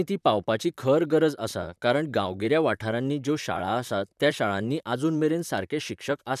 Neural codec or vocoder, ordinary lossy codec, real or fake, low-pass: vocoder, 44.1 kHz, 128 mel bands, Pupu-Vocoder; none; fake; 14.4 kHz